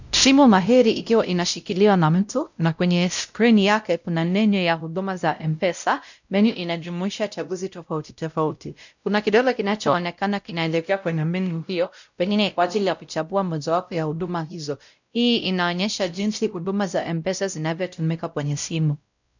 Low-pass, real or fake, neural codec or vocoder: 7.2 kHz; fake; codec, 16 kHz, 0.5 kbps, X-Codec, WavLM features, trained on Multilingual LibriSpeech